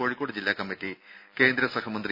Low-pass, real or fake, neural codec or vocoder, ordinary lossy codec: 5.4 kHz; real; none; none